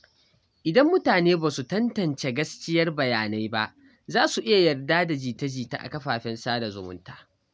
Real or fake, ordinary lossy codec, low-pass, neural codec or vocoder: real; none; none; none